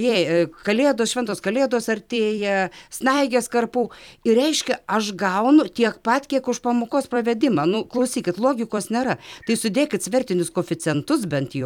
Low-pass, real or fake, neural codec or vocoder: 19.8 kHz; fake; vocoder, 44.1 kHz, 128 mel bands every 256 samples, BigVGAN v2